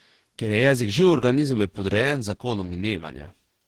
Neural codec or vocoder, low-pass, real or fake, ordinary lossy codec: codec, 44.1 kHz, 2.6 kbps, DAC; 19.8 kHz; fake; Opus, 16 kbps